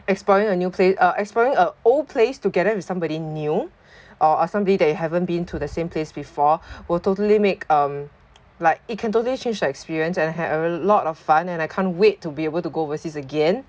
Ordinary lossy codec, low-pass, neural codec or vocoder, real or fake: none; none; none; real